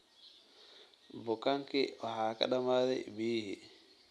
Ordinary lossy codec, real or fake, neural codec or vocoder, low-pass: none; real; none; none